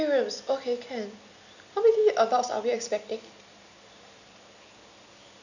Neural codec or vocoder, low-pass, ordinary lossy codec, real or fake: none; 7.2 kHz; none; real